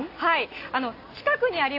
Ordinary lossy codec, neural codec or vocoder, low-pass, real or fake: AAC, 32 kbps; none; 5.4 kHz; real